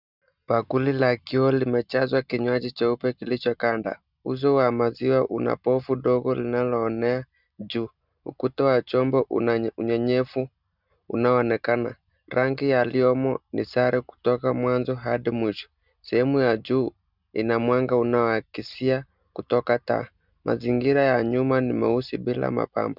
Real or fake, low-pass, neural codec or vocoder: real; 5.4 kHz; none